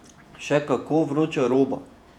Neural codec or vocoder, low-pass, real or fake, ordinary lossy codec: vocoder, 48 kHz, 128 mel bands, Vocos; 19.8 kHz; fake; none